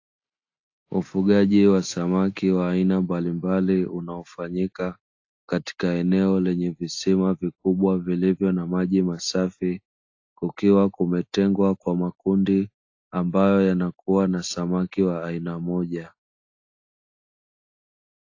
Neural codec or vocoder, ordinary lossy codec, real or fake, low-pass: none; AAC, 48 kbps; real; 7.2 kHz